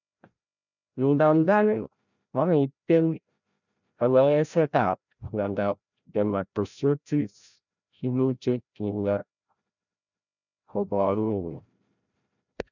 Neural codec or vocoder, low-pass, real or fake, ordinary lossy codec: codec, 16 kHz, 0.5 kbps, FreqCodec, larger model; 7.2 kHz; fake; none